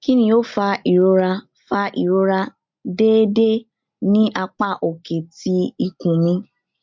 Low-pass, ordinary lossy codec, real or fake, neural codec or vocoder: 7.2 kHz; MP3, 48 kbps; real; none